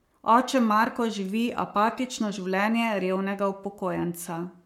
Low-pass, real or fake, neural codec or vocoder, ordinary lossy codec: 19.8 kHz; fake; codec, 44.1 kHz, 7.8 kbps, Pupu-Codec; MP3, 96 kbps